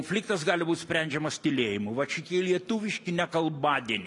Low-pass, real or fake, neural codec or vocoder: 10.8 kHz; real; none